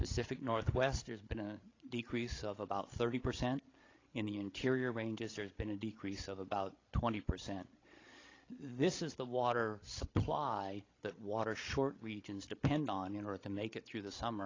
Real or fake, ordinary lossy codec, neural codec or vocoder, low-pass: fake; AAC, 32 kbps; codec, 16 kHz, 16 kbps, FreqCodec, larger model; 7.2 kHz